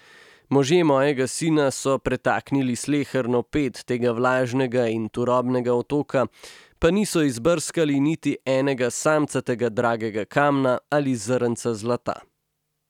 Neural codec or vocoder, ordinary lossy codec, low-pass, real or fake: none; none; 19.8 kHz; real